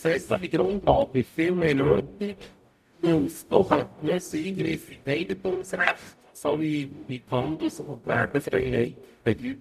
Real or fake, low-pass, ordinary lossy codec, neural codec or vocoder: fake; 14.4 kHz; none; codec, 44.1 kHz, 0.9 kbps, DAC